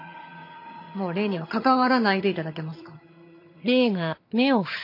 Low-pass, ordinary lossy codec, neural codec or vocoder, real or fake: 5.4 kHz; MP3, 32 kbps; vocoder, 22.05 kHz, 80 mel bands, HiFi-GAN; fake